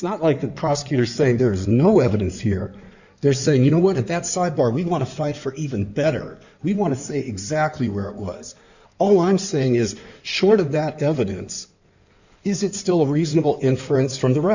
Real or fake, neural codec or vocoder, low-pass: fake; codec, 16 kHz in and 24 kHz out, 2.2 kbps, FireRedTTS-2 codec; 7.2 kHz